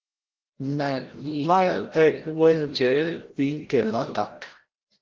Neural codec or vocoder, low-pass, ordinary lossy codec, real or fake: codec, 16 kHz, 0.5 kbps, FreqCodec, larger model; 7.2 kHz; Opus, 16 kbps; fake